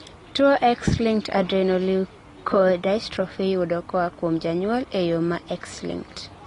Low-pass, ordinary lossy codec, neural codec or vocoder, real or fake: 10.8 kHz; AAC, 32 kbps; none; real